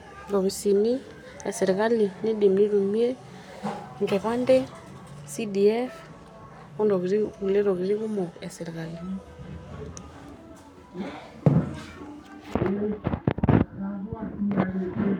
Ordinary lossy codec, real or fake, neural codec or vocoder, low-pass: none; fake; codec, 44.1 kHz, 7.8 kbps, Pupu-Codec; 19.8 kHz